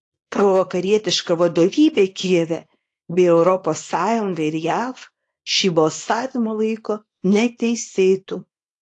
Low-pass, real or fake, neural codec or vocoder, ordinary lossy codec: 10.8 kHz; fake; codec, 24 kHz, 0.9 kbps, WavTokenizer, small release; AAC, 48 kbps